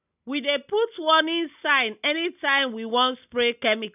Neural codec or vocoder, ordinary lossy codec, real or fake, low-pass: none; none; real; 3.6 kHz